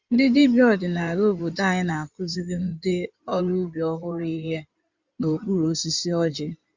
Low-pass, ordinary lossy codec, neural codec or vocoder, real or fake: 7.2 kHz; Opus, 64 kbps; codec, 16 kHz in and 24 kHz out, 2.2 kbps, FireRedTTS-2 codec; fake